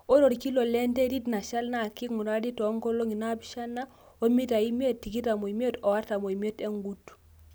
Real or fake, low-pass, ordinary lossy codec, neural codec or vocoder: real; none; none; none